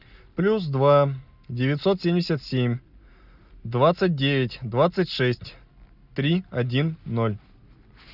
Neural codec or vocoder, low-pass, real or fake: none; 5.4 kHz; real